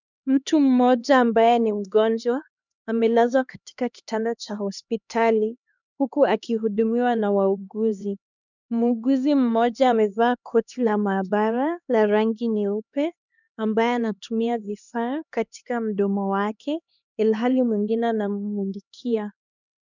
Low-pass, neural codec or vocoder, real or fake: 7.2 kHz; codec, 16 kHz, 2 kbps, X-Codec, HuBERT features, trained on LibriSpeech; fake